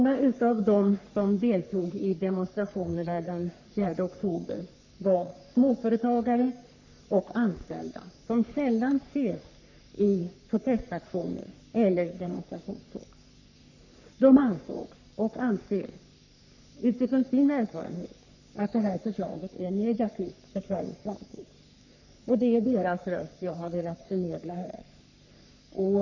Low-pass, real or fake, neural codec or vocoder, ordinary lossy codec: 7.2 kHz; fake; codec, 44.1 kHz, 3.4 kbps, Pupu-Codec; none